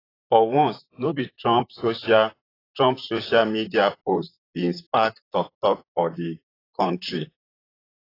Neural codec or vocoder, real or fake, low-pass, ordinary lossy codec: vocoder, 44.1 kHz, 128 mel bands, Pupu-Vocoder; fake; 5.4 kHz; AAC, 24 kbps